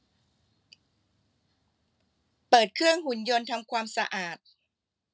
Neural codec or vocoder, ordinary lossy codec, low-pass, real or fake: none; none; none; real